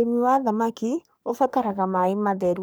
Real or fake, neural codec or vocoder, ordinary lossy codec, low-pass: fake; codec, 44.1 kHz, 3.4 kbps, Pupu-Codec; none; none